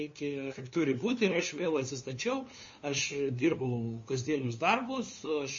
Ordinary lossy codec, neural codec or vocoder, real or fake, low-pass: MP3, 32 kbps; codec, 16 kHz, 2 kbps, FunCodec, trained on LibriTTS, 25 frames a second; fake; 7.2 kHz